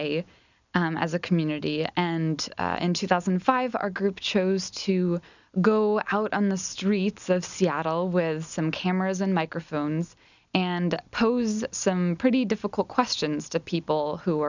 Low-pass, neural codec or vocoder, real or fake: 7.2 kHz; none; real